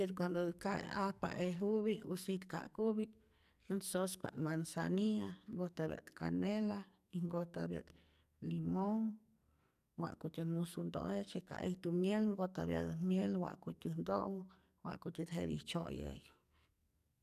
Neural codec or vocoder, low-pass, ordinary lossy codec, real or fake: codec, 44.1 kHz, 2.6 kbps, SNAC; 14.4 kHz; none; fake